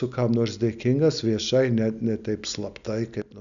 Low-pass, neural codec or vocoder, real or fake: 7.2 kHz; none; real